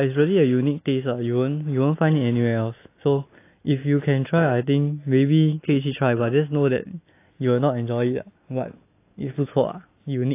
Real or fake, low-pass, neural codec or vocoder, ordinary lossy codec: real; 3.6 kHz; none; AAC, 24 kbps